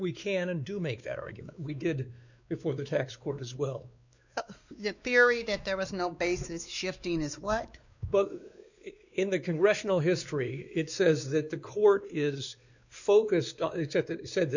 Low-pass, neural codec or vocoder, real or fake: 7.2 kHz; codec, 16 kHz, 2 kbps, X-Codec, WavLM features, trained on Multilingual LibriSpeech; fake